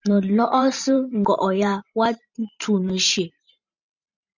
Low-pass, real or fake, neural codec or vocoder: 7.2 kHz; fake; vocoder, 44.1 kHz, 128 mel bands every 512 samples, BigVGAN v2